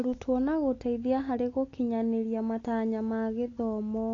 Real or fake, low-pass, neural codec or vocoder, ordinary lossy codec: real; 7.2 kHz; none; AAC, 32 kbps